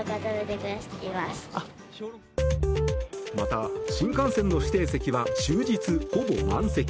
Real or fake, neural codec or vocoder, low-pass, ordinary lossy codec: real; none; none; none